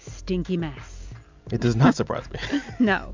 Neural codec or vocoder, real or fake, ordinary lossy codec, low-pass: none; real; MP3, 64 kbps; 7.2 kHz